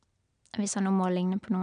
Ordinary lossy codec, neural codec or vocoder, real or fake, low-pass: none; none; real; 9.9 kHz